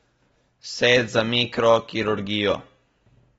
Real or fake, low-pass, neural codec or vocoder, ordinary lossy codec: real; 19.8 kHz; none; AAC, 24 kbps